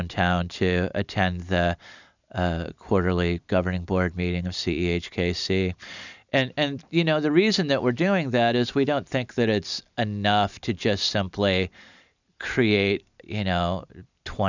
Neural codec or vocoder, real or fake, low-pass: none; real; 7.2 kHz